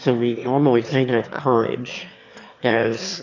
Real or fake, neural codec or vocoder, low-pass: fake; autoencoder, 22.05 kHz, a latent of 192 numbers a frame, VITS, trained on one speaker; 7.2 kHz